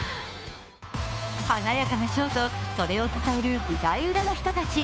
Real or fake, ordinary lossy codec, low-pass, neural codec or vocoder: fake; none; none; codec, 16 kHz, 2 kbps, FunCodec, trained on Chinese and English, 25 frames a second